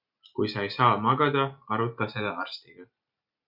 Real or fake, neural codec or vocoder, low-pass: real; none; 5.4 kHz